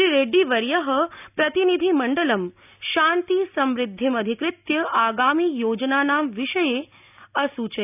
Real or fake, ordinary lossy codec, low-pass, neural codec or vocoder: real; none; 3.6 kHz; none